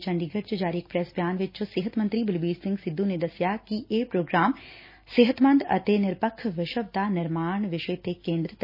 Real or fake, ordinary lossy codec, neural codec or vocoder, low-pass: real; MP3, 24 kbps; none; 5.4 kHz